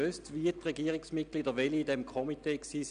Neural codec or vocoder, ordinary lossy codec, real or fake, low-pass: none; none; real; 9.9 kHz